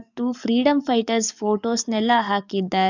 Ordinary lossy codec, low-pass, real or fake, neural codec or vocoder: none; 7.2 kHz; fake; codec, 44.1 kHz, 7.8 kbps, DAC